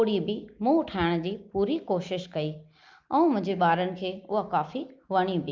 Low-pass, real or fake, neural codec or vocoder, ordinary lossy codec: 7.2 kHz; real; none; Opus, 24 kbps